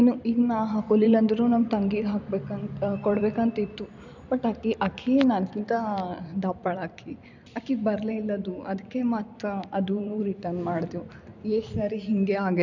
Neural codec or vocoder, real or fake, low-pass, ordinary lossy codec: vocoder, 44.1 kHz, 128 mel bands every 256 samples, BigVGAN v2; fake; 7.2 kHz; none